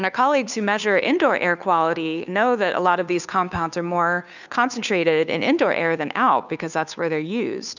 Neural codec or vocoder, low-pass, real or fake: codec, 16 kHz, 2 kbps, FunCodec, trained on Chinese and English, 25 frames a second; 7.2 kHz; fake